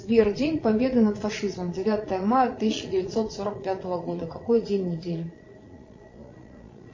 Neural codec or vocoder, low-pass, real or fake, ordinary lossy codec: vocoder, 22.05 kHz, 80 mel bands, Vocos; 7.2 kHz; fake; MP3, 32 kbps